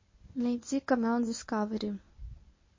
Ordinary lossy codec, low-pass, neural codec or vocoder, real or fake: MP3, 32 kbps; 7.2 kHz; codec, 24 kHz, 0.9 kbps, WavTokenizer, medium speech release version 1; fake